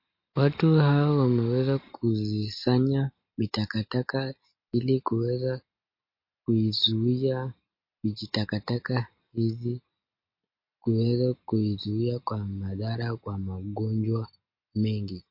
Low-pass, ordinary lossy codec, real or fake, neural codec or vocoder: 5.4 kHz; MP3, 32 kbps; real; none